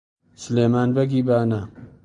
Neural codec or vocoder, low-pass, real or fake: none; 9.9 kHz; real